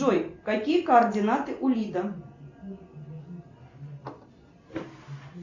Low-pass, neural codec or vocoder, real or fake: 7.2 kHz; none; real